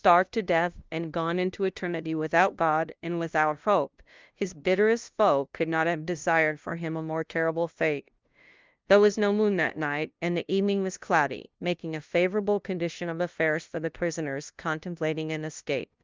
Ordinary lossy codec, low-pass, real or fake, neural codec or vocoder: Opus, 32 kbps; 7.2 kHz; fake; codec, 16 kHz, 0.5 kbps, FunCodec, trained on LibriTTS, 25 frames a second